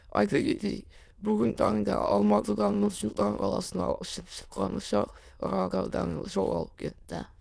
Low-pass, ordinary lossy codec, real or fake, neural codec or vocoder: none; none; fake; autoencoder, 22.05 kHz, a latent of 192 numbers a frame, VITS, trained on many speakers